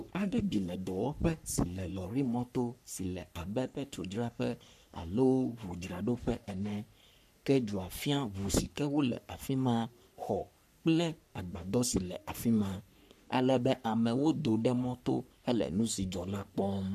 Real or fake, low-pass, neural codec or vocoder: fake; 14.4 kHz; codec, 44.1 kHz, 3.4 kbps, Pupu-Codec